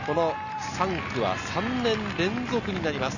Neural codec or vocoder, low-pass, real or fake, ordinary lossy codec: none; 7.2 kHz; real; none